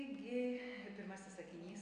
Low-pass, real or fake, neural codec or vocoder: 9.9 kHz; real; none